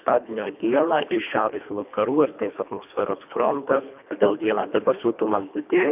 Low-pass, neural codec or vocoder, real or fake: 3.6 kHz; codec, 24 kHz, 1.5 kbps, HILCodec; fake